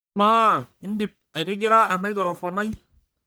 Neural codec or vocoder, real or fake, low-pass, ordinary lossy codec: codec, 44.1 kHz, 1.7 kbps, Pupu-Codec; fake; none; none